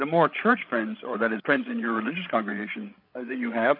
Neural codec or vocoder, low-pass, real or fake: codec, 16 kHz, 8 kbps, FreqCodec, larger model; 5.4 kHz; fake